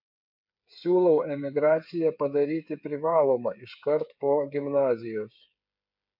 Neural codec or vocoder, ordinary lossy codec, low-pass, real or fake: codec, 16 kHz, 8 kbps, FreqCodec, smaller model; MP3, 48 kbps; 5.4 kHz; fake